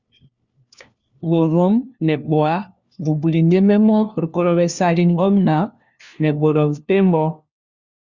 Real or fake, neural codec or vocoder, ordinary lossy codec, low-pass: fake; codec, 16 kHz, 1 kbps, FunCodec, trained on LibriTTS, 50 frames a second; Opus, 64 kbps; 7.2 kHz